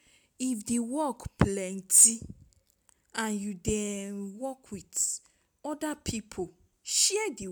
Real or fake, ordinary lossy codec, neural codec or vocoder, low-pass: real; none; none; none